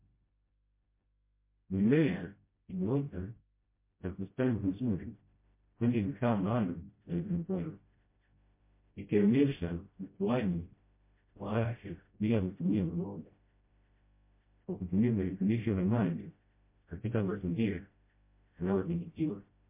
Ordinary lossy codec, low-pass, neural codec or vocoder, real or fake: MP3, 24 kbps; 3.6 kHz; codec, 16 kHz, 0.5 kbps, FreqCodec, smaller model; fake